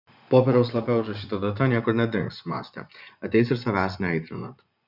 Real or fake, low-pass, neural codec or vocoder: fake; 5.4 kHz; vocoder, 24 kHz, 100 mel bands, Vocos